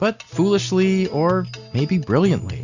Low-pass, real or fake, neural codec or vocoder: 7.2 kHz; real; none